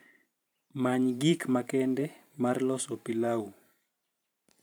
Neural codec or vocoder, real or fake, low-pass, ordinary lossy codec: vocoder, 44.1 kHz, 128 mel bands every 512 samples, BigVGAN v2; fake; none; none